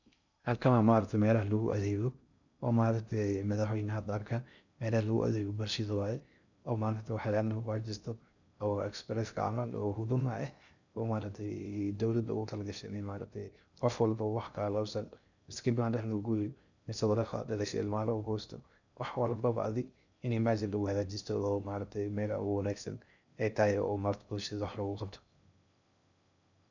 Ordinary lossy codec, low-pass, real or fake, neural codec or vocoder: none; 7.2 kHz; fake; codec, 16 kHz in and 24 kHz out, 0.6 kbps, FocalCodec, streaming, 2048 codes